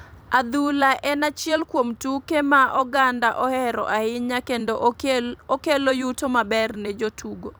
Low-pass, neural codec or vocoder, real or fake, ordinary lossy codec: none; vocoder, 44.1 kHz, 128 mel bands every 256 samples, BigVGAN v2; fake; none